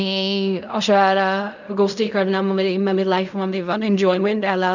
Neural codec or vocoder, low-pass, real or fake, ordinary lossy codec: codec, 16 kHz in and 24 kHz out, 0.4 kbps, LongCat-Audio-Codec, fine tuned four codebook decoder; 7.2 kHz; fake; none